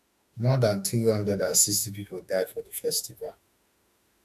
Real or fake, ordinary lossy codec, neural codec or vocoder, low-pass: fake; AAC, 96 kbps; autoencoder, 48 kHz, 32 numbers a frame, DAC-VAE, trained on Japanese speech; 14.4 kHz